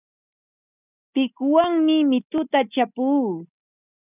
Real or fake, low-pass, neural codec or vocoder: real; 3.6 kHz; none